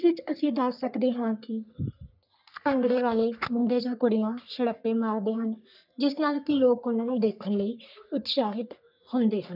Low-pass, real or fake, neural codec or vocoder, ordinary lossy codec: 5.4 kHz; fake; codec, 44.1 kHz, 3.4 kbps, Pupu-Codec; none